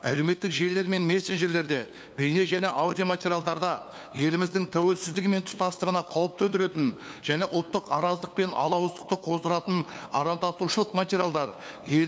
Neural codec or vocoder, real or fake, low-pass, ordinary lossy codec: codec, 16 kHz, 2 kbps, FunCodec, trained on LibriTTS, 25 frames a second; fake; none; none